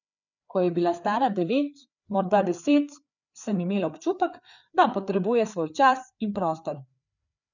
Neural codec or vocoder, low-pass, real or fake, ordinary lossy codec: codec, 16 kHz, 4 kbps, FreqCodec, larger model; 7.2 kHz; fake; none